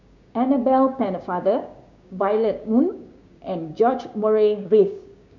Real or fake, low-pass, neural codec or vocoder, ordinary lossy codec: fake; 7.2 kHz; codec, 16 kHz, 6 kbps, DAC; none